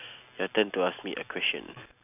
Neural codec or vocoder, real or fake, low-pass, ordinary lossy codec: none; real; 3.6 kHz; none